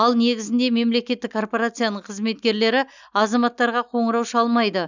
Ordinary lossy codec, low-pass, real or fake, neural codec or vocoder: none; 7.2 kHz; real; none